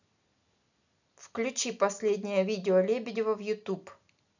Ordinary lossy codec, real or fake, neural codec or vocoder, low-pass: none; real; none; 7.2 kHz